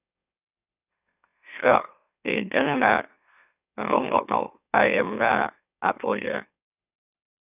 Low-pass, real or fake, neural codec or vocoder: 3.6 kHz; fake; autoencoder, 44.1 kHz, a latent of 192 numbers a frame, MeloTTS